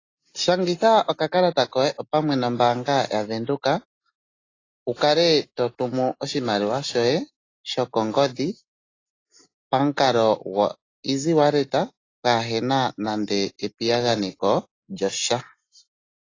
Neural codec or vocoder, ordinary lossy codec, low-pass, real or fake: none; AAC, 32 kbps; 7.2 kHz; real